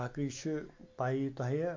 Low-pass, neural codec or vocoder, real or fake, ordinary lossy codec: 7.2 kHz; none; real; MP3, 48 kbps